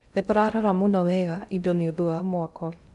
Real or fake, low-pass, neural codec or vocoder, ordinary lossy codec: fake; 10.8 kHz; codec, 16 kHz in and 24 kHz out, 0.6 kbps, FocalCodec, streaming, 2048 codes; none